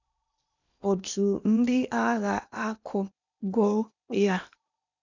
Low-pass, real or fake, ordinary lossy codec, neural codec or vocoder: 7.2 kHz; fake; none; codec, 16 kHz in and 24 kHz out, 0.8 kbps, FocalCodec, streaming, 65536 codes